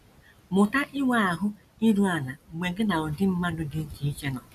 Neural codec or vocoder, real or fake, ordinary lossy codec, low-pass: none; real; none; 14.4 kHz